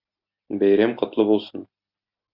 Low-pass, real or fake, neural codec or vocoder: 5.4 kHz; real; none